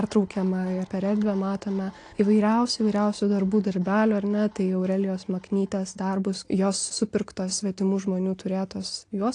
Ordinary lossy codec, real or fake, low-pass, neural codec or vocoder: AAC, 48 kbps; real; 9.9 kHz; none